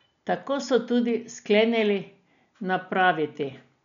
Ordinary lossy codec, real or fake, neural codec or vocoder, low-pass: none; real; none; 7.2 kHz